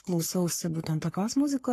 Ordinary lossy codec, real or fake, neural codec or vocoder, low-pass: AAC, 48 kbps; fake; codec, 44.1 kHz, 3.4 kbps, Pupu-Codec; 14.4 kHz